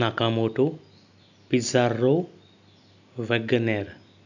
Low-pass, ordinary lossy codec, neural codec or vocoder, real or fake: 7.2 kHz; none; none; real